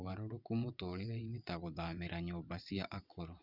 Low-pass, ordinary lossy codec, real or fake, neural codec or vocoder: 5.4 kHz; none; fake; vocoder, 22.05 kHz, 80 mel bands, WaveNeXt